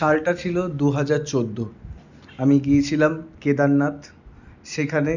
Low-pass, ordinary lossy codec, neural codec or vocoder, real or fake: 7.2 kHz; none; none; real